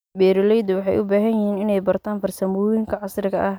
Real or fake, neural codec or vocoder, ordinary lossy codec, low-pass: real; none; none; none